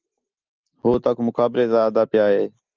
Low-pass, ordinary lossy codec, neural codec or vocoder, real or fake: 7.2 kHz; Opus, 24 kbps; none; real